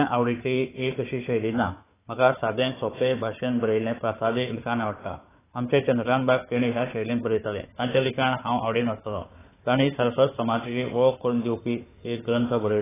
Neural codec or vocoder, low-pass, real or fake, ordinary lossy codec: codec, 16 kHz, 4 kbps, FunCodec, trained on Chinese and English, 50 frames a second; 3.6 kHz; fake; AAC, 16 kbps